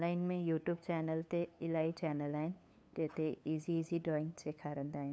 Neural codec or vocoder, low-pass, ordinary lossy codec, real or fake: codec, 16 kHz, 8 kbps, FunCodec, trained on LibriTTS, 25 frames a second; none; none; fake